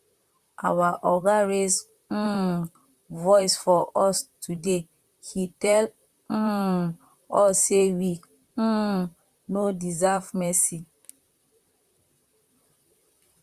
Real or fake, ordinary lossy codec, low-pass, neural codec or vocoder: fake; Opus, 64 kbps; 14.4 kHz; vocoder, 44.1 kHz, 128 mel bands, Pupu-Vocoder